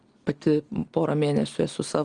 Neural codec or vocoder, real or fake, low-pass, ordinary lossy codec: none; real; 9.9 kHz; Opus, 24 kbps